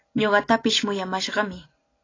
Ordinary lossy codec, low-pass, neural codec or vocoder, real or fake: AAC, 32 kbps; 7.2 kHz; none; real